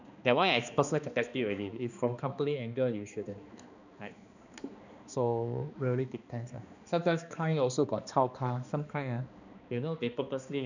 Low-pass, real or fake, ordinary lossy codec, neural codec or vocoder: 7.2 kHz; fake; none; codec, 16 kHz, 2 kbps, X-Codec, HuBERT features, trained on balanced general audio